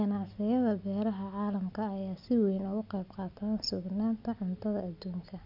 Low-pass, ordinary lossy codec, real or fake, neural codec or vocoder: 5.4 kHz; none; real; none